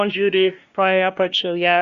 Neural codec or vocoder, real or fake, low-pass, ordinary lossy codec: codec, 16 kHz, 1 kbps, X-Codec, WavLM features, trained on Multilingual LibriSpeech; fake; 7.2 kHz; Opus, 64 kbps